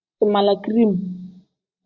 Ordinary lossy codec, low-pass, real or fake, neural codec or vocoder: Opus, 64 kbps; 7.2 kHz; real; none